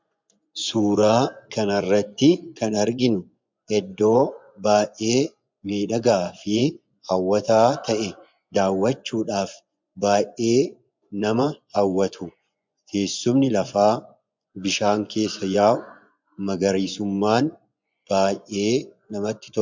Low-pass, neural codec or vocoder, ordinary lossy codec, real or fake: 7.2 kHz; vocoder, 24 kHz, 100 mel bands, Vocos; MP3, 64 kbps; fake